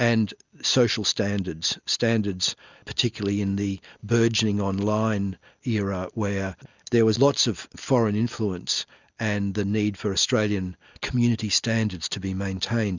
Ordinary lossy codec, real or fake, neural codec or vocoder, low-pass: Opus, 64 kbps; real; none; 7.2 kHz